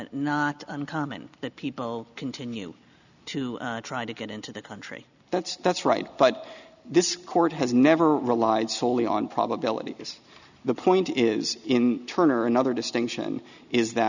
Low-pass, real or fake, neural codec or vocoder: 7.2 kHz; real; none